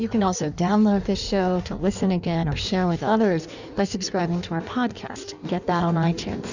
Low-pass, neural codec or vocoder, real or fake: 7.2 kHz; codec, 16 kHz in and 24 kHz out, 1.1 kbps, FireRedTTS-2 codec; fake